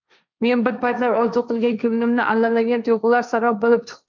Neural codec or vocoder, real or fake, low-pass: codec, 16 kHz, 1.1 kbps, Voila-Tokenizer; fake; 7.2 kHz